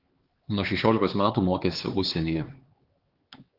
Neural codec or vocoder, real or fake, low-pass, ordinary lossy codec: codec, 16 kHz, 4 kbps, X-Codec, HuBERT features, trained on LibriSpeech; fake; 5.4 kHz; Opus, 16 kbps